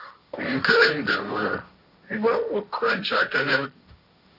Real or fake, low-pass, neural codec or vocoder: fake; 5.4 kHz; codec, 16 kHz, 1.1 kbps, Voila-Tokenizer